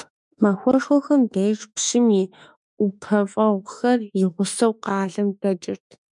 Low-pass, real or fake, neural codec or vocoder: 10.8 kHz; fake; autoencoder, 48 kHz, 32 numbers a frame, DAC-VAE, trained on Japanese speech